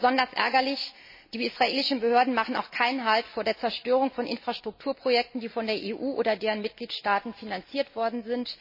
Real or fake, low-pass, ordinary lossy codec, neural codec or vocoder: real; 5.4 kHz; MP3, 24 kbps; none